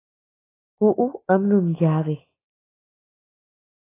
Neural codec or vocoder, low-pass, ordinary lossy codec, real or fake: none; 3.6 kHz; AAC, 16 kbps; real